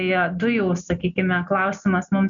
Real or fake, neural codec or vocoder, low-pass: real; none; 7.2 kHz